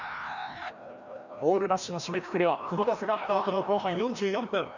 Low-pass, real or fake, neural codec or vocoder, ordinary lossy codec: 7.2 kHz; fake; codec, 16 kHz, 1 kbps, FreqCodec, larger model; none